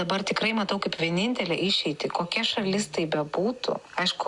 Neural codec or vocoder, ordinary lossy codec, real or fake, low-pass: none; Opus, 64 kbps; real; 10.8 kHz